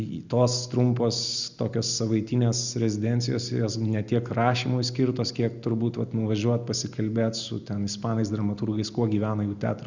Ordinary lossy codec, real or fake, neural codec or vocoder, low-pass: Opus, 64 kbps; real; none; 7.2 kHz